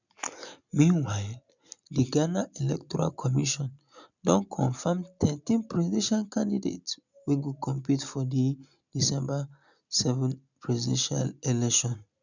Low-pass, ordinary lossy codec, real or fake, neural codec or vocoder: 7.2 kHz; none; real; none